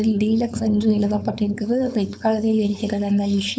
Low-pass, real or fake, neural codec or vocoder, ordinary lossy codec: none; fake; codec, 16 kHz, 4.8 kbps, FACodec; none